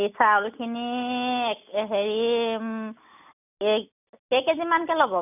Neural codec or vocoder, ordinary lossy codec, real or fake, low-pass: none; none; real; 3.6 kHz